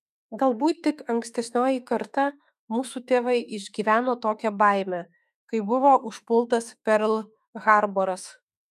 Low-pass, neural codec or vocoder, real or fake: 14.4 kHz; autoencoder, 48 kHz, 32 numbers a frame, DAC-VAE, trained on Japanese speech; fake